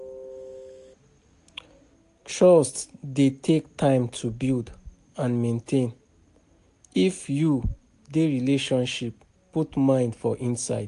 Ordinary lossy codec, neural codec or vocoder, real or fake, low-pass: AAC, 64 kbps; none; real; 10.8 kHz